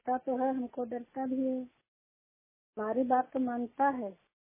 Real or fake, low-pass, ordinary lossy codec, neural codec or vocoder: real; 3.6 kHz; MP3, 16 kbps; none